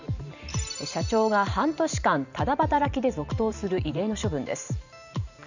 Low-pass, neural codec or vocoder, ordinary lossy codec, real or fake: 7.2 kHz; vocoder, 44.1 kHz, 128 mel bands every 512 samples, BigVGAN v2; none; fake